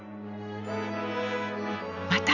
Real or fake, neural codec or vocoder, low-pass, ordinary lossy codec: real; none; 7.2 kHz; none